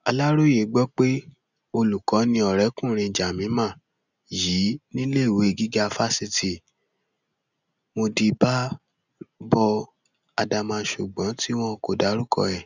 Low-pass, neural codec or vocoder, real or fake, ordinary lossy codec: 7.2 kHz; none; real; none